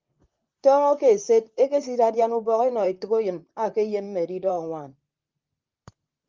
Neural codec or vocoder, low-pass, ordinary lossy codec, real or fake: vocoder, 44.1 kHz, 128 mel bands, Pupu-Vocoder; 7.2 kHz; Opus, 32 kbps; fake